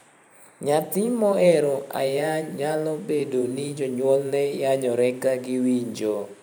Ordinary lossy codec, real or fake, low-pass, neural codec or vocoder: none; fake; none; vocoder, 44.1 kHz, 128 mel bands every 512 samples, BigVGAN v2